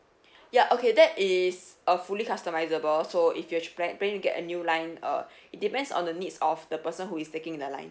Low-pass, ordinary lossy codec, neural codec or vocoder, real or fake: none; none; none; real